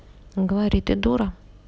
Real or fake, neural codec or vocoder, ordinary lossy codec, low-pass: real; none; none; none